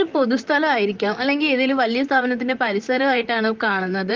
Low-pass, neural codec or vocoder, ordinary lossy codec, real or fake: 7.2 kHz; vocoder, 44.1 kHz, 128 mel bands, Pupu-Vocoder; Opus, 32 kbps; fake